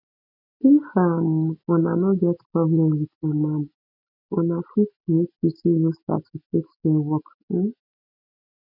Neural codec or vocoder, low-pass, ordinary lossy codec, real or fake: none; 5.4 kHz; none; real